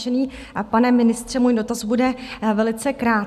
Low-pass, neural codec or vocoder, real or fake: 14.4 kHz; none; real